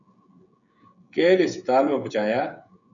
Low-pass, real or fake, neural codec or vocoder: 7.2 kHz; fake; codec, 16 kHz, 16 kbps, FreqCodec, smaller model